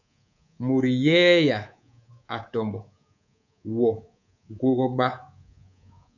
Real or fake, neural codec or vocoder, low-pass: fake; codec, 24 kHz, 3.1 kbps, DualCodec; 7.2 kHz